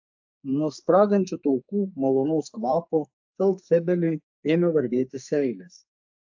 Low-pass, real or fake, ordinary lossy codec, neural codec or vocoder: 7.2 kHz; fake; AAC, 48 kbps; codec, 44.1 kHz, 2.6 kbps, SNAC